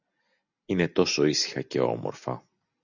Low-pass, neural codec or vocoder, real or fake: 7.2 kHz; none; real